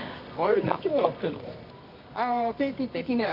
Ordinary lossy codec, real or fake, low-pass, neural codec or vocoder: none; fake; 5.4 kHz; codec, 24 kHz, 0.9 kbps, WavTokenizer, medium music audio release